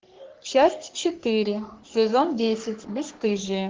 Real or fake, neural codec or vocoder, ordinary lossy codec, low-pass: fake; codec, 44.1 kHz, 3.4 kbps, Pupu-Codec; Opus, 16 kbps; 7.2 kHz